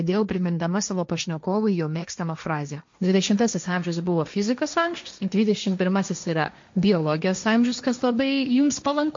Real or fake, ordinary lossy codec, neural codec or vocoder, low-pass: fake; MP3, 48 kbps; codec, 16 kHz, 1.1 kbps, Voila-Tokenizer; 7.2 kHz